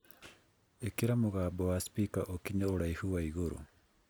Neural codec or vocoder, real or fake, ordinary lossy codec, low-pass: none; real; none; none